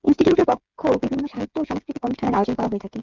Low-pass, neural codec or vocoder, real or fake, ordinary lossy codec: 7.2 kHz; codec, 16 kHz, 8 kbps, FreqCodec, smaller model; fake; Opus, 32 kbps